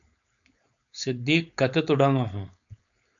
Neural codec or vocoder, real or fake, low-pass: codec, 16 kHz, 4.8 kbps, FACodec; fake; 7.2 kHz